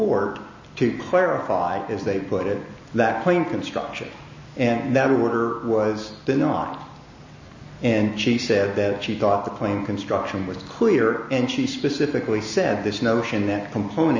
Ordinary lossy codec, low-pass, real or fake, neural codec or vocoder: MP3, 32 kbps; 7.2 kHz; real; none